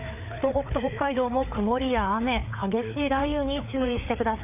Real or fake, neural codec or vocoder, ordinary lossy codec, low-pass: fake; codec, 16 kHz, 4 kbps, FreqCodec, larger model; none; 3.6 kHz